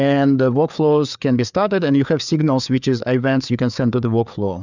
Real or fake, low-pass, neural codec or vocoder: fake; 7.2 kHz; codec, 16 kHz, 4 kbps, FreqCodec, larger model